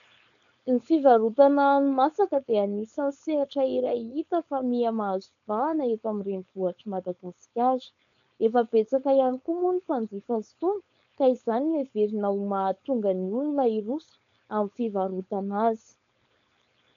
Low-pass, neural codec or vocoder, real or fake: 7.2 kHz; codec, 16 kHz, 4.8 kbps, FACodec; fake